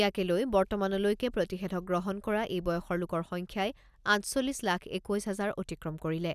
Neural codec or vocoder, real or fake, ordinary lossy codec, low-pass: none; real; none; 14.4 kHz